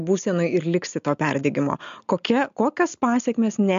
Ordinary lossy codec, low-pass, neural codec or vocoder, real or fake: MP3, 64 kbps; 7.2 kHz; none; real